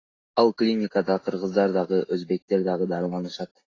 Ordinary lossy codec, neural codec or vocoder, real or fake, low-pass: AAC, 32 kbps; none; real; 7.2 kHz